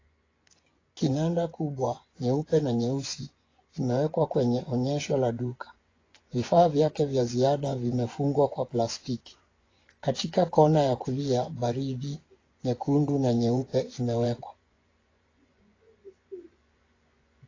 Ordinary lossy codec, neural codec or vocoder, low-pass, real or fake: AAC, 32 kbps; vocoder, 24 kHz, 100 mel bands, Vocos; 7.2 kHz; fake